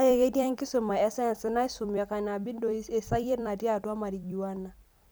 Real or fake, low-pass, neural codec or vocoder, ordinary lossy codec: fake; none; vocoder, 44.1 kHz, 128 mel bands every 256 samples, BigVGAN v2; none